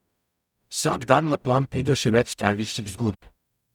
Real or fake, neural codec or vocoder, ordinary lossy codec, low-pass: fake; codec, 44.1 kHz, 0.9 kbps, DAC; none; 19.8 kHz